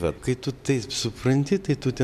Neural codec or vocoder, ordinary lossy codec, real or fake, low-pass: none; MP3, 96 kbps; real; 14.4 kHz